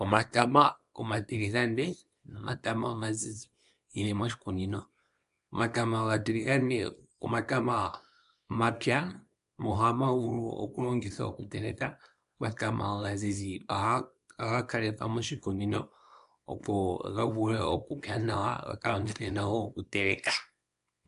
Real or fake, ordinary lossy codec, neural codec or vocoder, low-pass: fake; MP3, 96 kbps; codec, 24 kHz, 0.9 kbps, WavTokenizer, small release; 10.8 kHz